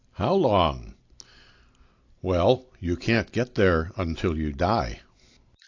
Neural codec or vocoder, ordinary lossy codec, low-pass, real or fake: none; AAC, 48 kbps; 7.2 kHz; real